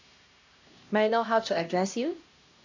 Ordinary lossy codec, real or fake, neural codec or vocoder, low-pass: AAC, 48 kbps; fake; codec, 16 kHz, 1 kbps, X-Codec, HuBERT features, trained on LibriSpeech; 7.2 kHz